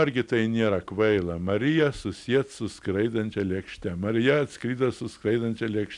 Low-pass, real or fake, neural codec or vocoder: 10.8 kHz; real; none